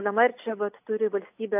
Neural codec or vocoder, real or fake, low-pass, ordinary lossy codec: none; real; 3.6 kHz; AAC, 32 kbps